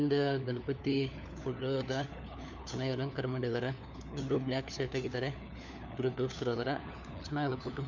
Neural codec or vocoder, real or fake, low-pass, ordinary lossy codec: codec, 16 kHz, 4 kbps, FunCodec, trained on LibriTTS, 50 frames a second; fake; 7.2 kHz; none